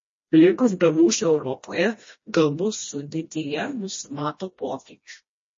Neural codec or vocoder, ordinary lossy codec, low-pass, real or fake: codec, 16 kHz, 1 kbps, FreqCodec, smaller model; MP3, 32 kbps; 7.2 kHz; fake